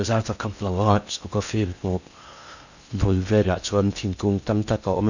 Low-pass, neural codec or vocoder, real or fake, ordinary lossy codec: 7.2 kHz; codec, 16 kHz in and 24 kHz out, 0.6 kbps, FocalCodec, streaming, 4096 codes; fake; none